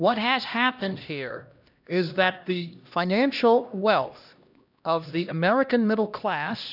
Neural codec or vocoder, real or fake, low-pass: codec, 16 kHz, 1 kbps, X-Codec, HuBERT features, trained on LibriSpeech; fake; 5.4 kHz